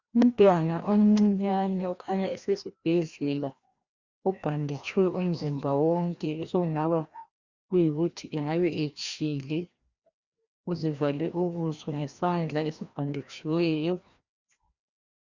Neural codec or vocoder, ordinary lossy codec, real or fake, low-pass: codec, 16 kHz, 1 kbps, FreqCodec, larger model; Opus, 64 kbps; fake; 7.2 kHz